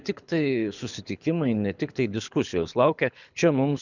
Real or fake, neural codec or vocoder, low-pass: fake; codec, 24 kHz, 6 kbps, HILCodec; 7.2 kHz